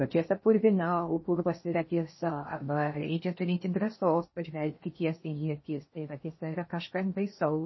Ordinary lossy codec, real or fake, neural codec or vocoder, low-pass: MP3, 24 kbps; fake; codec, 16 kHz in and 24 kHz out, 0.6 kbps, FocalCodec, streaming, 4096 codes; 7.2 kHz